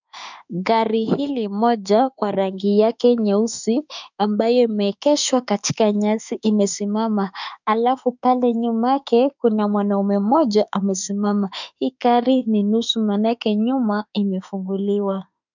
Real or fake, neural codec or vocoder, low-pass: fake; autoencoder, 48 kHz, 32 numbers a frame, DAC-VAE, trained on Japanese speech; 7.2 kHz